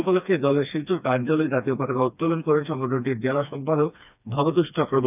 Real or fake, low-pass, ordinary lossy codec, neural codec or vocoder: fake; 3.6 kHz; none; codec, 16 kHz, 2 kbps, FreqCodec, smaller model